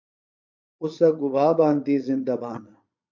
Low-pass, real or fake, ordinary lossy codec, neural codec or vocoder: 7.2 kHz; fake; MP3, 48 kbps; vocoder, 22.05 kHz, 80 mel bands, Vocos